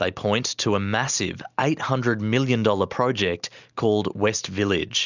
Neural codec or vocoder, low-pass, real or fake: none; 7.2 kHz; real